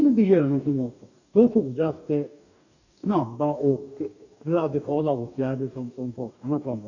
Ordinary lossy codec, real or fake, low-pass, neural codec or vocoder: none; fake; 7.2 kHz; codec, 44.1 kHz, 2.6 kbps, DAC